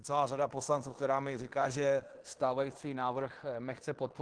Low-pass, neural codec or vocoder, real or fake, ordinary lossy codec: 9.9 kHz; codec, 16 kHz in and 24 kHz out, 0.9 kbps, LongCat-Audio-Codec, fine tuned four codebook decoder; fake; Opus, 16 kbps